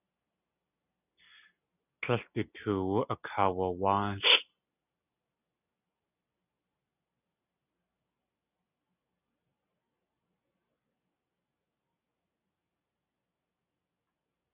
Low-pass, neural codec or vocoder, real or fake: 3.6 kHz; none; real